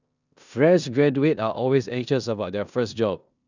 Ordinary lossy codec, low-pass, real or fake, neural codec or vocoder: none; 7.2 kHz; fake; codec, 16 kHz in and 24 kHz out, 0.9 kbps, LongCat-Audio-Codec, four codebook decoder